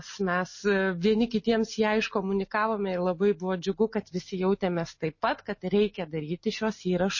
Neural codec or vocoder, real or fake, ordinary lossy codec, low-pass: none; real; MP3, 32 kbps; 7.2 kHz